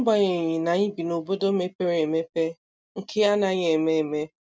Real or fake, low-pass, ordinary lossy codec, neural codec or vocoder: real; none; none; none